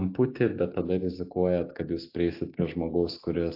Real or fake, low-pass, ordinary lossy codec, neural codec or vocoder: real; 5.4 kHz; AAC, 32 kbps; none